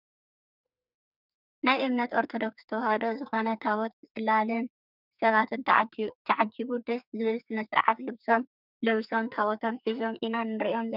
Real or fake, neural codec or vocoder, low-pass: fake; codec, 44.1 kHz, 2.6 kbps, SNAC; 5.4 kHz